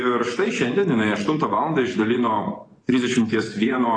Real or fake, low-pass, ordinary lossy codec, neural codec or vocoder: fake; 9.9 kHz; AAC, 32 kbps; vocoder, 24 kHz, 100 mel bands, Vocos